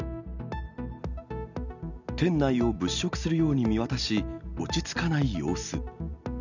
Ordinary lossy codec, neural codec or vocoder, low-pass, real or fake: none; none; 7.2 kHz; real